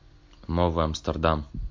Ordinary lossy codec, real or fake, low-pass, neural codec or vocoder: MP3, 48 kbps; real; 7.2 kHz; none